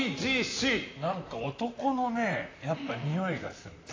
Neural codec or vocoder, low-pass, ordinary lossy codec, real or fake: vocoder, 44.1 kHz, 128 mel bands, Pupu-Vocoder; 7.2 kHz; AAC, 32 kbps; fake